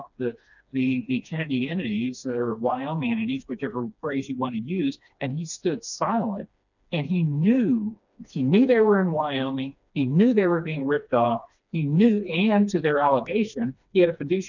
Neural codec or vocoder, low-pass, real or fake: codec, 16 kHz, 2 kbps, FreqCodec, smaller model; 7.2 kHz; fake